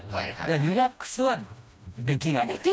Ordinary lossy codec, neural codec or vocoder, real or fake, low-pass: none; codec, 16 kHz, 1 kbps, FreqCodec, smaller model; fake; none